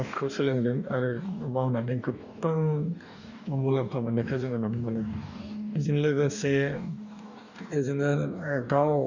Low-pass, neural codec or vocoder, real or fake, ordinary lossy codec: 7.2 kHz; codec, 44.1 kHz, 2.6 kbps, DAC; fake; none